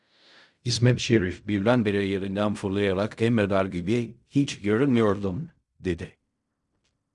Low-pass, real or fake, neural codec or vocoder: 10.8 kHz; fake; codec, 16 kHz in and 24 kHz out, 0.4 kbps, LongCat-Audio-Codec, fine tuned four codebook decoder